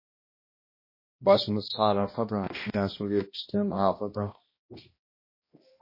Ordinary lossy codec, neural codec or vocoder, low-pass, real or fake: MP3, 24 kbps; codec, 16 kHz, 1 kbps, X-Codec, HuBERT features, trained on balanced general audio; 5.4 kHz; fake